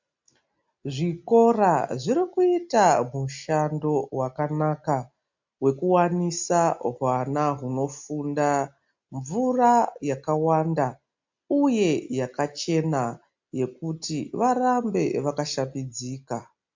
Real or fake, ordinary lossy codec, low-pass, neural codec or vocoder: real; MP3, 64 kbps; 7.2 kHz; none